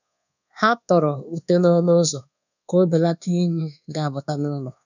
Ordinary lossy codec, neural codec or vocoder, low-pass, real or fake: none; codec, 24 kHz, 1.2 kbps, DualCodec; 7.2 kHz; fake